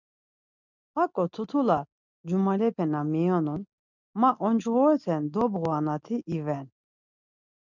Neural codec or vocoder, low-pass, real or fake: none; 7.2 kHz; real